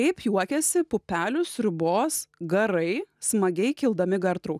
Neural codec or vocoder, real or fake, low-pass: none; real; 14.4 kHz